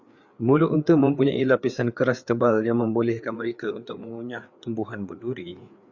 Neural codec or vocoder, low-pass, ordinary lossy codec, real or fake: codec, 16 kHz, 4 kbps, FreqCodec, larger model; 7.2 kHz; Opus, 64 kbps; fake